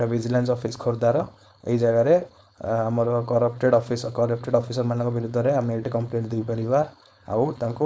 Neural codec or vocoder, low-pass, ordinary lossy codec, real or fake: codec, 16 kHz, 4.8 kbps, FACodec; none; none; fake